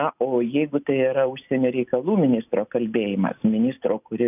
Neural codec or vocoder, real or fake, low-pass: none; real; 3.6 kHz